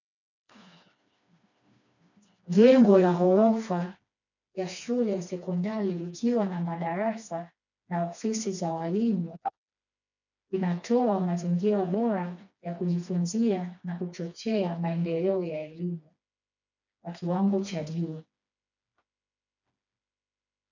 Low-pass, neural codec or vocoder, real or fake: 7.2 kHz; codec, 16 kHz, 2 kbps, FreqCodec, smaller model; fake